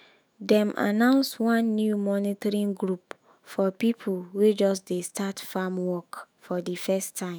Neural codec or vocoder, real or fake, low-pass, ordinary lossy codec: autoencoder, 48 kHz, 128 numbers a frame, DAC-VAE, trained on Japanese speech; fake; none; none